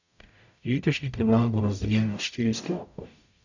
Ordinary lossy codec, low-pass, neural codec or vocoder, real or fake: none; 7.2 kHz; codec, 44.1 kHz, 0.9 kbps, DAC; fake